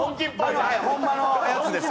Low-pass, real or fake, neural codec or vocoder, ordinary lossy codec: none; real; none; none